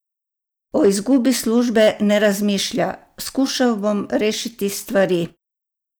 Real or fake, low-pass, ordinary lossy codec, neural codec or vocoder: real; none; none; none